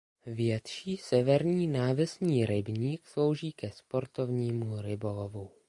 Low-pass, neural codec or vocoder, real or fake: 10.8 kHz; none; real